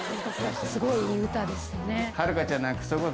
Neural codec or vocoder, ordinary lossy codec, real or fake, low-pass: none; none; real; none